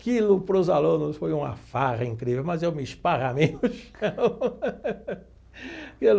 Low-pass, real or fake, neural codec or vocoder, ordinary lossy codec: none; real; none; none